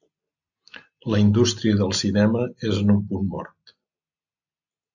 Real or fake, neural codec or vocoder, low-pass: real; none; 7.2 kHz